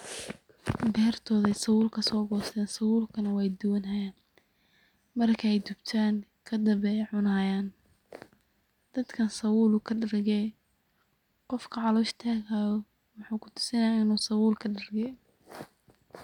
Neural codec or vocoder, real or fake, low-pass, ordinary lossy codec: none; real; 19.8 kHz; none